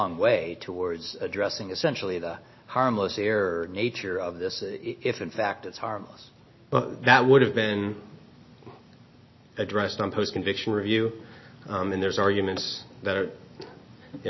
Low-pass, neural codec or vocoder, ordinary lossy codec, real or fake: 7.2 kHz; none; MP3, 24 kbps; real